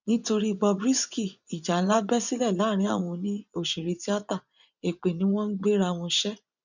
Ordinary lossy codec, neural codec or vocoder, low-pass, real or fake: none; vocoder, 24 kHz, 100 mel bands, Vocos; 7.2 kHz; fake